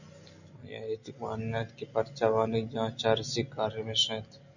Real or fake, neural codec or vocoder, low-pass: real; none; 7.2 kHz